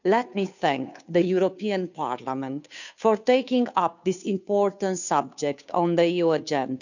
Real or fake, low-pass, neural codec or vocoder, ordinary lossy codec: fake; 7.2 kHz; codec, 16 kHz, 2 kbps, FunCodec, trained on Chinese and English, 25 frames a second; none